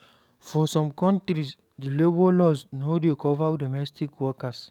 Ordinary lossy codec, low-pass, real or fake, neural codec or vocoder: none; 19.8 kHz; fake; codec, 44.1 kHz, 7.8 kbps, DAC